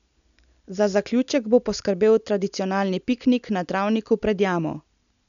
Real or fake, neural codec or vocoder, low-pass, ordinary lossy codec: real; none; 7.2 kHz; none